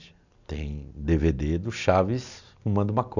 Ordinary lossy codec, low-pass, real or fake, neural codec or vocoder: none; 7.2 kHz; real; none